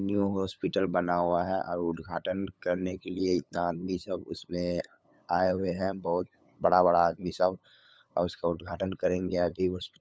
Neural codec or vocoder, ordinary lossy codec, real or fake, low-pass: codec, 16 kHz, 8 kbps, FunCodec, trained on LibriTTS, 25 frames a second; none; fake; none